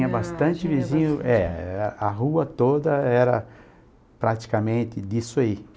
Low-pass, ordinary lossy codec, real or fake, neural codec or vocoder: none; none; real; none